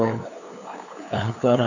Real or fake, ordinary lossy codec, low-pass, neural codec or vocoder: fake; none; 7.2 kHz; codec, 16 kHz, 2 kbps, FunCodec, trained on LibriTTS, 25 frames a second